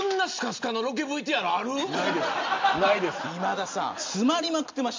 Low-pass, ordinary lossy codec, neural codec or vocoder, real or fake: 7.2 kHz; MP3, 64 kbps; none; real